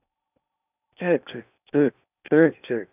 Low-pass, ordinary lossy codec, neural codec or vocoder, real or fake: 3.6 kHz; none; codec, 16 kHz in and 24 kHz out, 0.8 kbps, FocalCodec, streaming, 65536 codes; fake